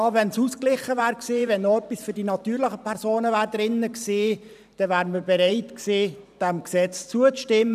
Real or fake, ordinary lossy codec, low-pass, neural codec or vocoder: fake; none; 14.4 kHz; vocoder, 44.1 kHz, 128 mel bands every 512 samples, BigVGAN v2